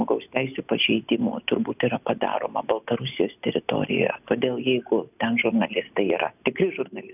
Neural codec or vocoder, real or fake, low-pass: none; real; 3.6 kHz